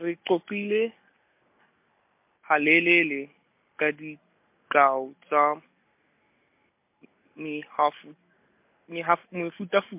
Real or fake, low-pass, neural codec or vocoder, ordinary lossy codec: real; 3.6 kHz; none; MP3, 32 kbps